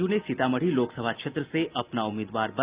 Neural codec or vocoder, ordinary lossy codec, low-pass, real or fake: none; Opus, 32 kbps; 3.6 kHz; real